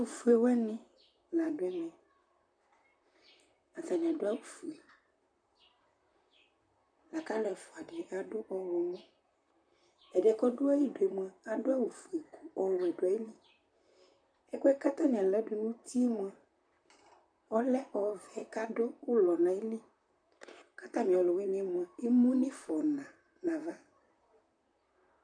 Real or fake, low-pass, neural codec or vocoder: fake; 9.9 kHz; vocoder, 44.1 kHz, 128 mel bands, Pupu-Vocoder